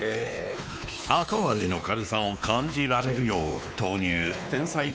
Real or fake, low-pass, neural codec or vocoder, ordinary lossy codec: fake; none; codec, 16 kHz, 2 kbps, X-Codec, WavLM features, trained on Multilingual LibriSpeech; none